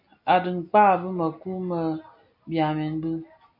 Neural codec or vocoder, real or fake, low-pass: none; real; 5.4 kHz